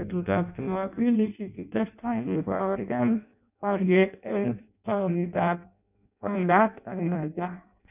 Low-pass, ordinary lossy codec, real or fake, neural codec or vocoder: 3.6 kHz; none; fake; codec, 16 kHz in and 24 kHz out, 0.6 kbps, FireRedTTS-2 codec